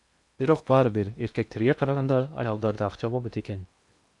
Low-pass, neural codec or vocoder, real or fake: 10.8 kHz; codec, 16 kHz in and 24 kHz out, 0.8 kbps, FocalCodec, streaming, 65536 codes; fake